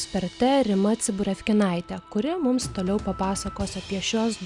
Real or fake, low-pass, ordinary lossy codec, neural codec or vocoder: real; 10.8 kHz; Opus, 64 kbps; none